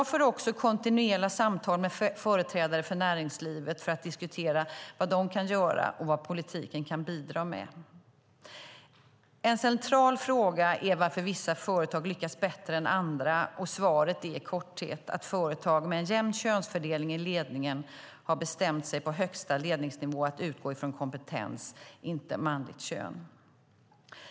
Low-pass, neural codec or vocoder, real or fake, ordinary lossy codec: none; none; real; none